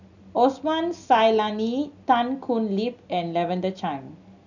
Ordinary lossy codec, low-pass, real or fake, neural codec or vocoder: none; 7.2 kHz; real; none